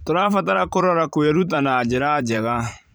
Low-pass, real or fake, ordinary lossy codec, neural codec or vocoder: none; fake; none; vocoder, 44.1 kHz, 128 mel bands every 512 samples, BigVGAN v2